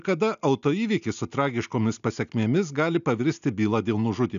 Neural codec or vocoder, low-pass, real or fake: none; 7.2 kHz; real